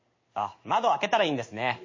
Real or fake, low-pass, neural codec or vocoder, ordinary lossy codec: fake; 7.2 kHz; codec, 16 kHz in and 24 kHz out, 1 kbps, XY-Tokenizer; MP3, 32 kbps